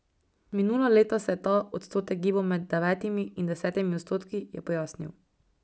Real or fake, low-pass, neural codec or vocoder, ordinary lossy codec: real; none; none; none